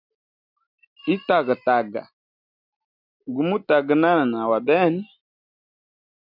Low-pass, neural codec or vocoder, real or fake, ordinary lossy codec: 5.4 kHz; none; real; MP3, 48 kbps